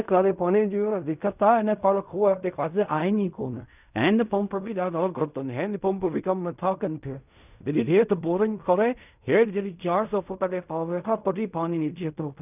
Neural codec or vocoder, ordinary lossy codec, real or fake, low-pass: codec, 16 kHz in and 24 kHz out, 0.4 kbps, LongCat-Audio-Codec, fine tuned four codebook decoder; none; fake; 3.6 kHz